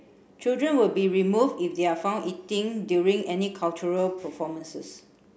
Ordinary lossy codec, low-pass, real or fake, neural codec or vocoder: none; none; real; none